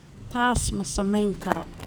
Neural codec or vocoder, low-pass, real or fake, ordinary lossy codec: codec, 44.1 kHz, 2.6 kbps, SNAC; none; fake; none